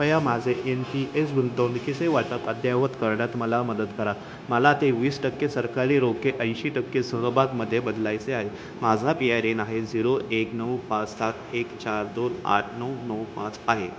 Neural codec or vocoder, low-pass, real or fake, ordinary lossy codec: codec, 16 kHz, 0.9 kbps, LongCat-Audio-Codec; none; fake; none